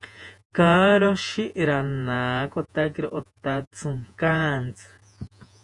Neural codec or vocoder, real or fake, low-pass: vocoder, 48 kHz, 128 mel bands, Vocos; fake; 10.8 kHz